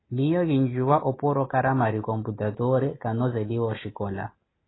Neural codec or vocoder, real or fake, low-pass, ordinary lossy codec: none; real; 7.2 kHz; AAC, 16 kbps